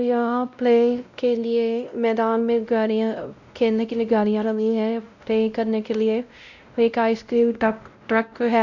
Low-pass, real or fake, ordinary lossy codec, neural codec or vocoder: 7.2 kHz; fake; none; codec, 16 kHz, 0.5 kbps, X-Codec, WavLM features, trained on Multilingual LibriSpeech